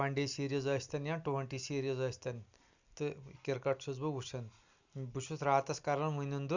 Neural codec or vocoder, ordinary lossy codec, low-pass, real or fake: none; Opus, 64 kbps; 7.2 kHz; real